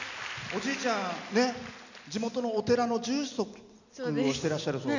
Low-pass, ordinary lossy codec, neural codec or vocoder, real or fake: 7.2 kHz; none; none; real